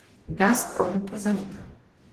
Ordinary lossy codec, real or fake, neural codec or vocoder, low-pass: Opus, 16 kbps; fake; codec, 44.1 kHz, 0.9 kbps, DAC; 14.4 kHz